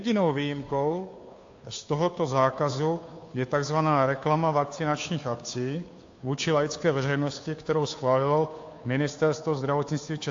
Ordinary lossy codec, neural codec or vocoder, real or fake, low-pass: AAC, 48 kbps; codec, 16 kHz, 2 kbps, FunCodec, trained on Chinese and English, 25 frames a second; fake; 7.2 kHz